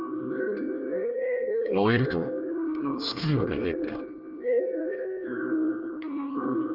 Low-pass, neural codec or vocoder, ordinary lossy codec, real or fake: 5.4 kHz; codec, 24 kHz, 1 kbps, SNAC; Opus, 32 kbps; fake